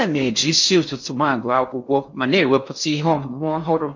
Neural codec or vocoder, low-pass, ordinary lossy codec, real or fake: codec, 16 kHz in and 24 kHz out, 0.6 kbps, FocalCodec, streaming, 4096 codes; 7.2 kHz; MP3, 48 kbps; fake